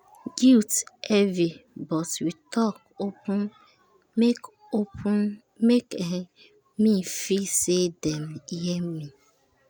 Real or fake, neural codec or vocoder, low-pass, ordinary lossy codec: real; none; none; none